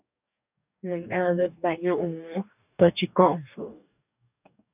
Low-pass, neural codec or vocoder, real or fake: 3.6 kHz; codec, 44.1 kHz, 2.6 kbps, DAC; fake